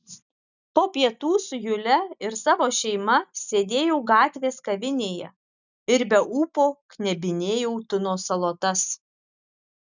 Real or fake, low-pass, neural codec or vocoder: real; 7.2 kHz; none